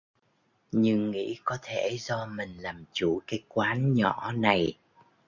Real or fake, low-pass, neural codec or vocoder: real; 7.2 kHz; none